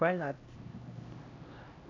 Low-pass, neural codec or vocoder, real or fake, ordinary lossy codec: 7.2 kHz; codec, 16 kHz, 1 kbps, X-Codec, HuBERT features, trained on LibriSpeech; fake; AAC, 32 kbps